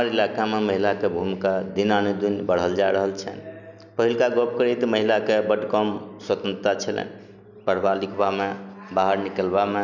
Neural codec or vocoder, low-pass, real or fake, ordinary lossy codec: none; 7.2 kHz; real; none